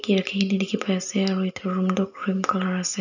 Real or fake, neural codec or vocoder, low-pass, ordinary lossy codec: real; none; 7.2 kHz; none